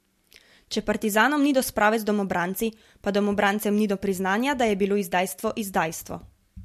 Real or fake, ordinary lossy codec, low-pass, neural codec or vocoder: real; MP3, 64 kbps; 14.4 kHz; none